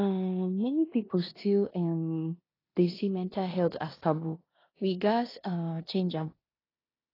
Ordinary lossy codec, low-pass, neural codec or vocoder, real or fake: AAC, 24 kbps; 5.4 kHz; codec, 16 kHz in and 24 kHz out, 0.9 kbps, LongCat-Audio-Codec, four codebook decoder; fake